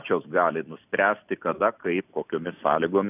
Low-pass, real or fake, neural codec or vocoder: 3.6 kHz; real; none